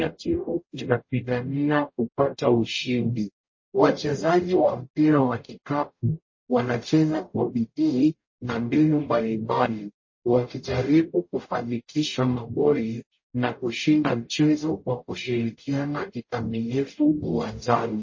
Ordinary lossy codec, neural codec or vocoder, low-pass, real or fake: MP3, 32 kbps; codec, 44.1 kHz, 0.9 kbps, DAC; 7.2 kHz; fake